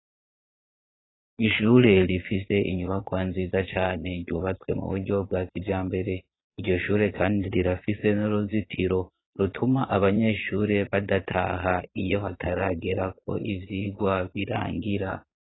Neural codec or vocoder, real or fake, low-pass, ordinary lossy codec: vocoder, 22.05 kHz, 80 mel bands, Vocos; fake; 7.2 kHz; AAC, 16 kbps